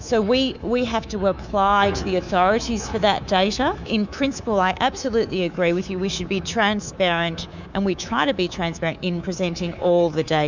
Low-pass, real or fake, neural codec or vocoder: 7.2 kHz; fake; codec, 24 kHz, 3.1 kbps, DualCodec